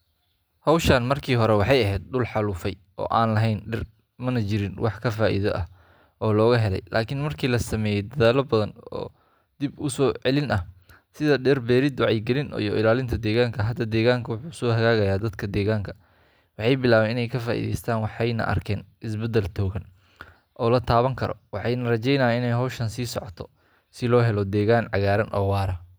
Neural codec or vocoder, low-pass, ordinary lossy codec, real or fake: none; none; none; real